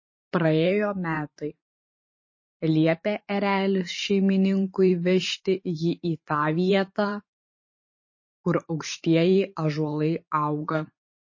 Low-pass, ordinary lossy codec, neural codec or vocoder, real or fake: 7.2 kHz; MP3, 32 kbps; vocoder, 44.1 kHz, 128 mel bands every 512 samples, BigVGAN v2; fake